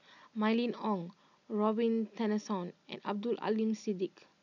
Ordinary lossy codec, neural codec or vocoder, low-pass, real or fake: none; none; 7.2 kHz; real